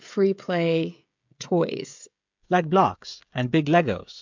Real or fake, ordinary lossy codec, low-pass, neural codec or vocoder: fake; MP3, 64 kbps; 7.2 kHz; codec, 16 kHz, 16 kbps, FreqCodec, smaller model